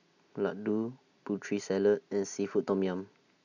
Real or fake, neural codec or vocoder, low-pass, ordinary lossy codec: real; none; 7.2 kHz; none